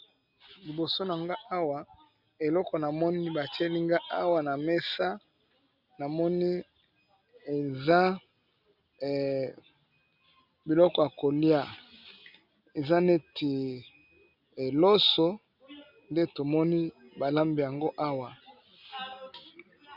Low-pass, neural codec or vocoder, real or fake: 5.4 kHz; none; real